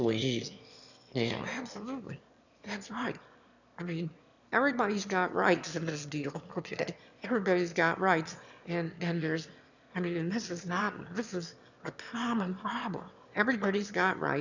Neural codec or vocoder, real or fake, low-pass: autoencoder, 22.05 kHz, a latent of 192 numbers a frame, VITS, trained on one speaker; fake; 7.2 kHz